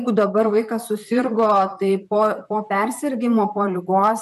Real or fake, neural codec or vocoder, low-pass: fake; vocoder, 44.1 kHz, 128 mel bands, Pupu-Vocoder; 14.4 kHz